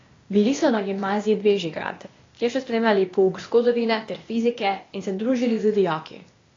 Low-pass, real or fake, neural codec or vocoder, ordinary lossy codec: 7.2 kHz; fake; codec, 16 kHz, 0.8 kbps, ZipCodec; AAC, 32 kbps